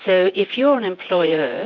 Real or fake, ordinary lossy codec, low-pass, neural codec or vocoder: fake; MP3, 64 kbps; 7.2 kHz; vocoder, 24 kHz, 100 mel bands, Vocos